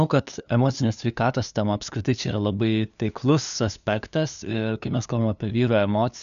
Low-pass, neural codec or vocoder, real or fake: 7.2 kHz; codec, 16 kHz, 2 kbps, FunCodec, trained on LibriTTS, 25 frames a second; fake